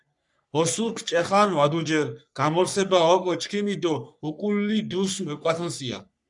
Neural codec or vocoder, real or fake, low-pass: codec, 44.1 kHz, 3.4 kbps, Pupu-Codec; fake; 10.8 kHz